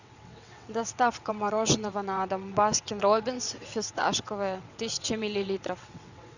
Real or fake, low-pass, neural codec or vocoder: fake; 7.2 kHz; vocoder, 22.05 kHz, 80 mel bands, WaveNeXt